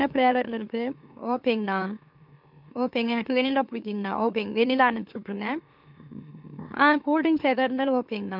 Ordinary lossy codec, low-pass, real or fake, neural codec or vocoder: MP3, 48 kbps; 5.4 kHz; fake; autoencoder, 44.1 kHz, a latent of 192 numbers a frame, MeloTTS